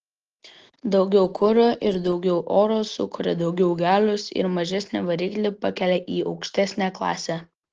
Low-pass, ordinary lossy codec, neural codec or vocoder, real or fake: 7.2 kHz; Opus, 16 kbps; none; real